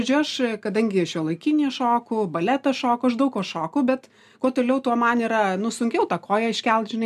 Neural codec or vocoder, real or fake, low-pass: none; real; 14.4 kHz